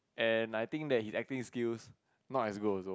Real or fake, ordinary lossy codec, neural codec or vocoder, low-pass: real; none; none; none